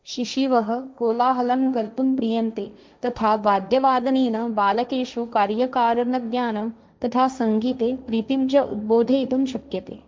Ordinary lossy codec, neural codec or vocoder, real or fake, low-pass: none; codec, 16 kHz, 1.1 kbps, Voila-Tokenizer; fake; none